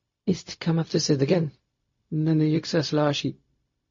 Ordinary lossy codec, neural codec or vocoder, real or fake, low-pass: MP3, 32 kbps; codec, 16 kHz, 0.4 kbps, LongCat-Audio-Codec; fake; 7.2 kHz